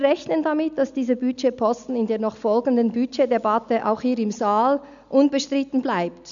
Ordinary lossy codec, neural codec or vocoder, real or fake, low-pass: none; none; real; 7.2 kHz